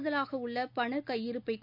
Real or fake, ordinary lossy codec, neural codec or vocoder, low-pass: real; none; none; 5.4 kHz